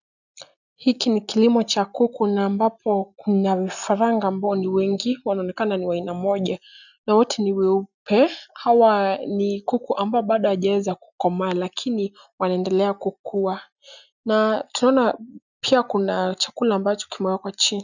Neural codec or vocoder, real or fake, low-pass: none; real; 7.2 kHz